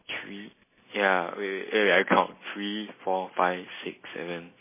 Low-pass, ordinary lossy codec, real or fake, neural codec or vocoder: 3.6 kHz; MP3, 16 kbps; real; none